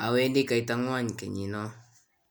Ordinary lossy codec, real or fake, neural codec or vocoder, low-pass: none; real; none; none